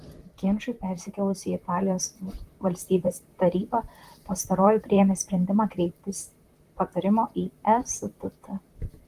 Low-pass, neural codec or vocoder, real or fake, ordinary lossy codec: 14.4 kHz; vocoder, 44.1 kHz, 128 mel bands, Pupu-Vocoder; fake; Opus, 24 kbps